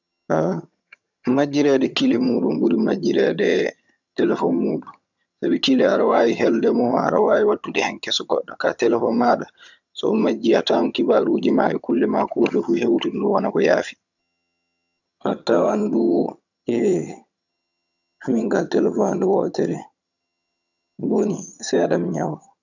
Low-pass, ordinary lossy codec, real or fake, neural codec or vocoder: 7.2 kHz; none; fake; vocoder, 22.05 kHz, 80 mel bands, HiFi-GAN